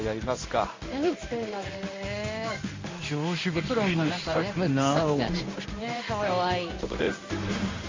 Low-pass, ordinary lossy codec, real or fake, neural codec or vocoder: 7.2 kHz; MP3, 48 kbps; fake; codec, 16 kHz in and 24 kHz out, 1 kbps, XY-Tokenizer